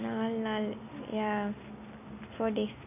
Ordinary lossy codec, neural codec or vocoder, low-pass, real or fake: none; none; 3.6 kHz; real